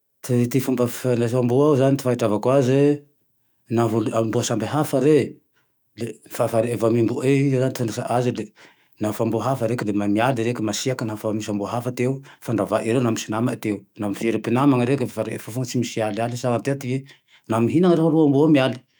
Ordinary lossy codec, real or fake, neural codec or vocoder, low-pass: none; fake; autoencoder, 48 kHz, 128 numbers a frame, DAC-VAE, trained on Japanese speech; none